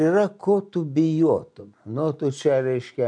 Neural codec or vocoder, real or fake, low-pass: none; real; 9.9 kHz